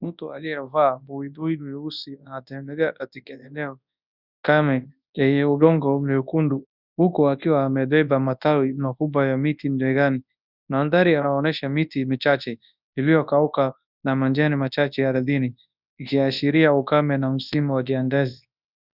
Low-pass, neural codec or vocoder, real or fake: 5.4 kHz; codec, 24 kHz, 0.9 kbps, WavTokenizer, large speech release; fake